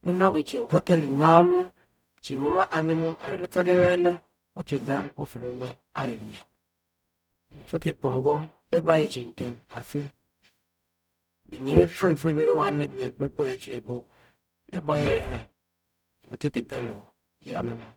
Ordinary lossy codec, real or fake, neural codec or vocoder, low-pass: none; fake; codec, 44.1 kHz, 0.9 kbps, DAC; 19.8 kHz